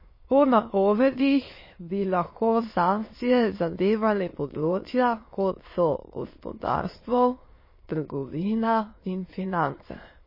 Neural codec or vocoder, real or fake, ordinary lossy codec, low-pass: autoencoder, 22.05 kHz, a latent of 192 numbers a frame, VITS, trained on many speakers; fake; MP3, 24 kbps; 5.4 kHz